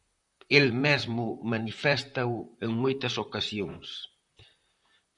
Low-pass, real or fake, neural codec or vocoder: 10.8 kHz; fake; vocoder, 44.1 kHz, 128 mel bands, Pupu-Vocoder